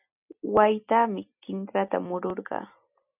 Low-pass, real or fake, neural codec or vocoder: 3.6 kHz; real; none